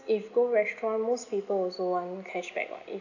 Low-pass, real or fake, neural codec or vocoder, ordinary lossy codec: 7.2 kHz; real; none; none